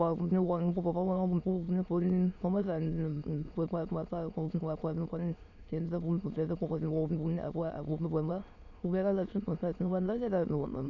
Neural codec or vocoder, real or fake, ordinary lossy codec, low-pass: autoencoder, 22.05 kHz, a latent of 192 numbers a frame, VITS, trained on many speakers; fake; none; 7.2 kHz